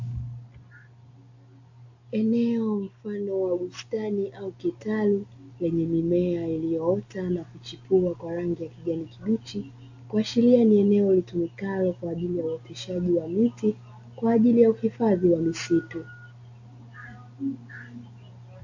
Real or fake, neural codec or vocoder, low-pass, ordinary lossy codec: real; none; 7.2 kHz; AAC, 48 kbps